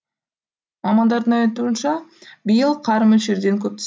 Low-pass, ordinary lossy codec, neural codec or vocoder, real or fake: none; none; none; real